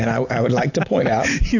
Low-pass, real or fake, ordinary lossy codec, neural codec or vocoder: 7.2 kHz; real; AAC, 48 kbps; none